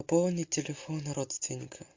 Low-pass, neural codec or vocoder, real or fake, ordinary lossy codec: 7.2 kHz; none; real; MP3, 48 kbps